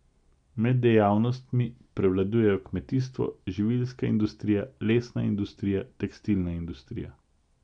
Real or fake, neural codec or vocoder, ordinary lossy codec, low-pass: real; none; none; 9.9 kHz